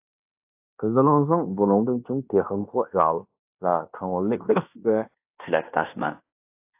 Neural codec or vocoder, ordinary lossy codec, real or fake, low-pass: codec, 16 kHz in and 24 kHz out, 0.9 kbps, LongCat-Audio-Codec, fine tuned four codebook decoder; AAC, 32 kbps; fake; 3.6 kHz